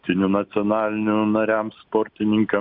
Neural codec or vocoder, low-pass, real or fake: none; 5.4 kHz; real